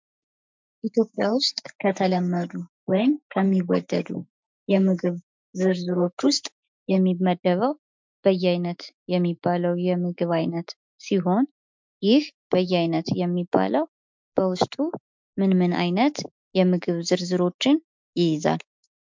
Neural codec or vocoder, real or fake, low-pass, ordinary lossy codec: autoencoder, 48 kHz, 128 numbers a frame, DAC-VAE, trained on Japanese speech; fake; 7.2 kHz; MP3, 64 kbps